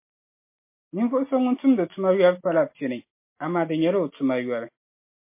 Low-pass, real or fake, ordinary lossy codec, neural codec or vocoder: 3.6 kHz; real; MP3, 24 kbps; none